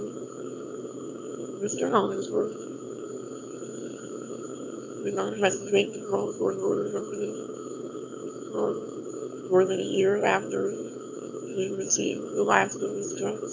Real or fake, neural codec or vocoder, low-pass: fake; autoencoder, 22.05 kHz, a latent of 192 numbers a frame, VITS, trained on one speaker; 7.2 kHz